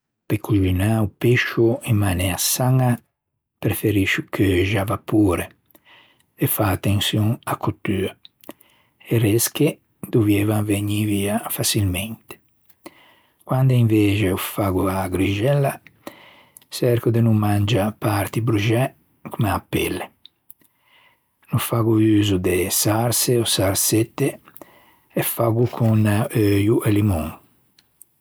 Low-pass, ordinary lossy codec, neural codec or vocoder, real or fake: none; none; none; real